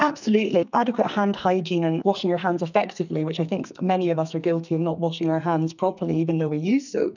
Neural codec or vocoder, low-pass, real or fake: codec, 44.1 kHz, 2.6 kbps, SNAC; 7.2 kHz; fake